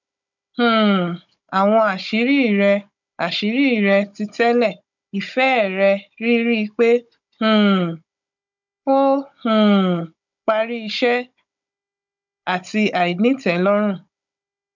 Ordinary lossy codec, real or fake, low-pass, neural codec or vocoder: none; fake; 7.2 kHz; codec, 16 kHz, 16 kbps, FunCodec, trained on Chinese and English, 50 frames a second